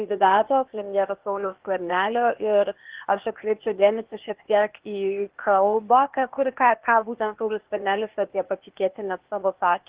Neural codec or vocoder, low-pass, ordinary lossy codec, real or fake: codec, 16 kHz, 0.8 kbps, ZipCodec; 3.6 kHz; Opus, 16 kbps; fake